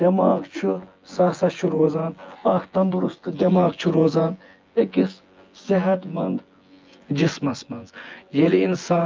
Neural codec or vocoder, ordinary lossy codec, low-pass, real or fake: vocoder, 24 kHz, 100 mel bands, Vocos; Opus, 24 kbps; 7.2 kHz; fake